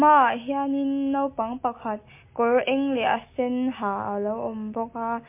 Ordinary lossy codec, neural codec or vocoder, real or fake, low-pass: MP3, 24 kbps; none; real; 3.6 kHz